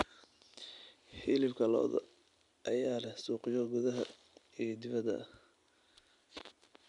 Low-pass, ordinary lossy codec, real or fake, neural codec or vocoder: 10.8 kHz; none; real; none